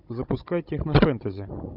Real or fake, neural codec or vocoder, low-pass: fake; codec, 16 kHz, 16 kbps, FunCodec, trained on Chinese and English, 50 frames a second; 5.4 kHz